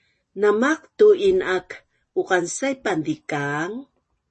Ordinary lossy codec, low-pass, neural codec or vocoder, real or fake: MP3, 32 kbps; 10.8 kHz; none; real